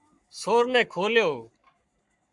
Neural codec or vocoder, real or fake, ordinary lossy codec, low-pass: codec, 44.1 kHz, 7.8 kbps, Pupu-Codec; fake; MP3, 96 kbps; 10.8 kHz